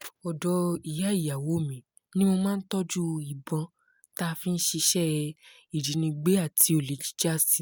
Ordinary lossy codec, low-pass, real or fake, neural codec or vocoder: none; none; real; none